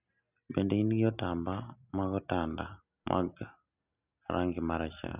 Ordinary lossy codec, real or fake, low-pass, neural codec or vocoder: none; real; 3.6 kHz; none